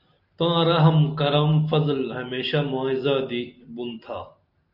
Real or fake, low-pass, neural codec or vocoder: real; 5.4 kHz; none